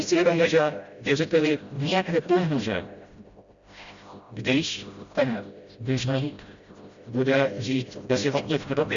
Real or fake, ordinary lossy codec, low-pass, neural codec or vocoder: fake; Opus, 64 kbps; 7.2 kHz; codec, 16 kHz, 0.5 kbps, FreqCodec, smaller model